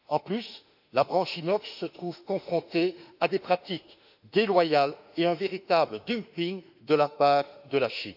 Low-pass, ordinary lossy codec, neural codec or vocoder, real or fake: 5.4 kHz; none; autoencoder, 48 kHz, 32 numbers a frame, DAC-VAE, trained on Japanese speech; fake